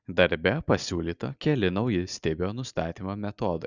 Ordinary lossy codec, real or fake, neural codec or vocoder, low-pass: Opus, 64 kbps; real; none; 7.2 kHz